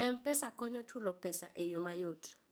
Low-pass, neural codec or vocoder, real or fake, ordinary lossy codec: none; codec, 44.1 kHz, 2.6 kbps, SNAC; fake; none